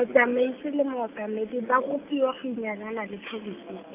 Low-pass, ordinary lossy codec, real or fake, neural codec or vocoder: 3.6 kHz; none; real; none